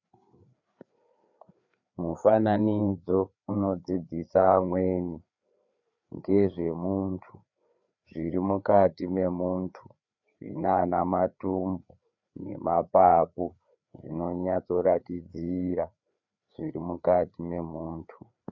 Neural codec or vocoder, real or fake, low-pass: codec, 16 kHz, 4 kbps, FreqCodec, larger model; fake; 7.2 kHz